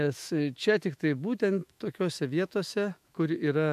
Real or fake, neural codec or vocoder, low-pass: fake; autoencoder, 48 kHz, 128 numbers a frame, DAC-VAE, trained on Japanese speech; 14.4 kHz